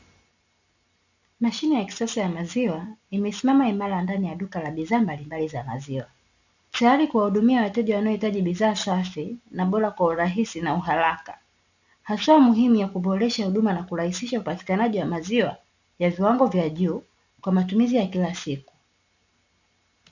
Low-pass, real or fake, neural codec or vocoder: 7.2 kHz; real; none